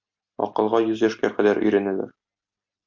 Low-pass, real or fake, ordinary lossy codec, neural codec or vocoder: 7.2 kHz; real; MP3, 48 kbps; none